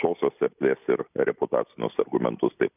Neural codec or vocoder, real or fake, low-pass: none; real; 3.6 kHz